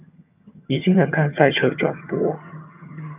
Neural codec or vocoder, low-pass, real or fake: vocoder, 22.05 kHz, 80 mel bands, HiFi-GAN; 3.6 kHz; fake